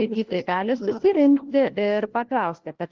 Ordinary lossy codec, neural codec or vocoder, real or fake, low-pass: Opus, 16 kbps; codec, 16 kHz, 1 kbps, FunCodec, trained on LibriTTS, 50 frames a second; fake; 7.2 kHz